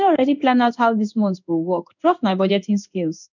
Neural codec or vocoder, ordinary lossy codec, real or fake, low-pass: codec, 16 kHz in and 24 kHz out, 1 kbps, XY-Tokenizer; none; fake; 7.2 kHz